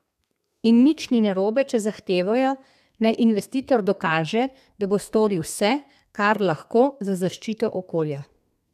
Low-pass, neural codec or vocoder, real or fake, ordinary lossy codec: 14.4 kHz; codec, 32 kHz, 1.9 kbps, SNAC; fake; none